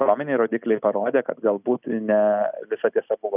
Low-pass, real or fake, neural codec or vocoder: 3.6 kHz; real; none